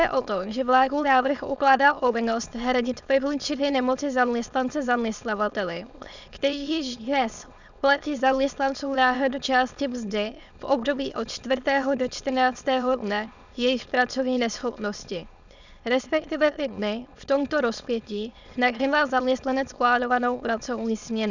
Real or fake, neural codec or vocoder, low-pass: fake; autoencoder, 22.05 kHz, a latent of 192 numbers a frame, VITS, trained on many speakers; 7.2 kHz